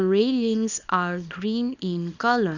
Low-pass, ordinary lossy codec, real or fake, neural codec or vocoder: 7.2 kHz; none; fake; codec, 24 kHz, 0.9 kbps, WavTokenizer, small release